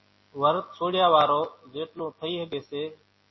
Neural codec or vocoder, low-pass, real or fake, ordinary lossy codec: none; 7.2 kHz; real; MP3, 24 kbps